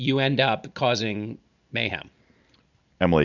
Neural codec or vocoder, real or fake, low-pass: none; real; 7.2 kHz